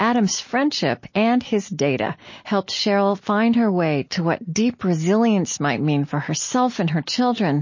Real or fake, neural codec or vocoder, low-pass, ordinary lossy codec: real; none; 7.2 kHz; MP3, 32 kbps